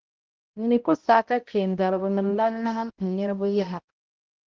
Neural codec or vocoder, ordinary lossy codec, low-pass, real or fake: codec, 16 kHz, 0.5 kbps, X-Codec, HuBERT features, trained on balanced general audio; Opus, 16 kbps; 7.2 kHz; fake